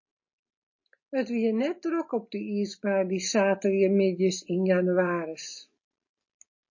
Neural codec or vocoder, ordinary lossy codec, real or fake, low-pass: none; MP3, 32 kbps; real; 7.2 kHz